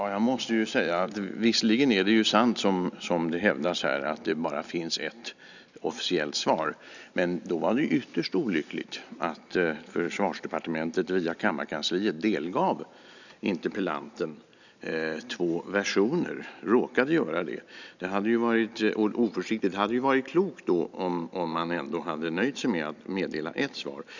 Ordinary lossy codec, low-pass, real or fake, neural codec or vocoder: none; 7.2 kHz; real; none